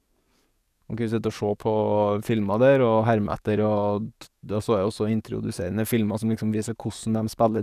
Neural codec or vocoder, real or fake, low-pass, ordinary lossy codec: codec, 44.1 kHz, 7.8 kbps, DAC; fake; 14.4 kHz; none